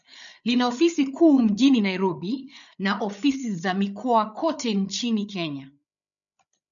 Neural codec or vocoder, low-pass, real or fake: codec, 16 kHz, 8 kbps, FreqCodec, larger model; 7.2 kHz; fake